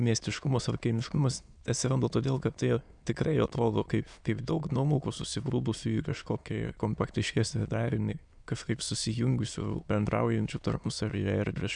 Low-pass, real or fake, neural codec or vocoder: 9.9 kHz; fake; autoencoder, 22.05 kHz, a latent of 192 numbers a frame, VITS, trained on many speakers